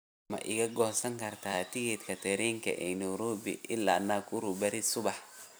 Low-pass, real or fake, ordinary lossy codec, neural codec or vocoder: none; fake; none; vocoder, 44.1 kHz, 128 mel bands every 256 samples, BigVGAN v2